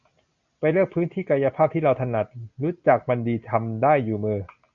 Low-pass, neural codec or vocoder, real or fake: 7.2 kHz; none; real